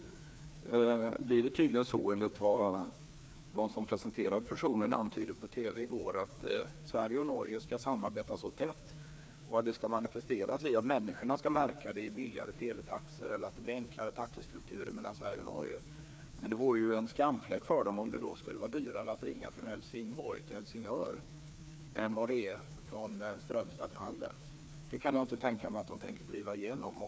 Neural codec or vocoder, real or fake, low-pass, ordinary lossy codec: codec, 16 kHz, 2 kbps, FreqCodec, larger model; fake; none; none